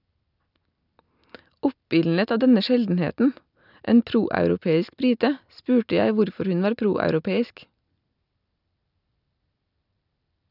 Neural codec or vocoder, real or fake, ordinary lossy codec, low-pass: none; real; none; 5.4 kHz